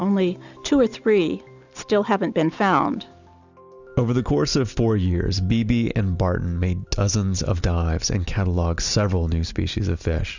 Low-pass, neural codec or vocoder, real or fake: 7.2 kHz; none; real